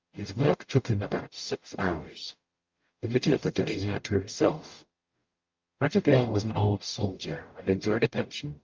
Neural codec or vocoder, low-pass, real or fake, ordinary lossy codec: codec, 44.1 kHz, 0.9 kbps, DAC; 7.2 kHz; fake; Opus, 24 kbps